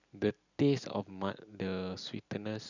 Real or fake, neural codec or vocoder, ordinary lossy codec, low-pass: real; none; none; 7.2 kHz